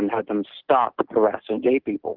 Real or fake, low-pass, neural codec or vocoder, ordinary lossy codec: real; 5.4 kHz; none; Opus, 16 kbps